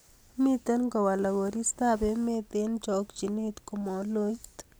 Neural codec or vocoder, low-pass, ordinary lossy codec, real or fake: none; none; none; real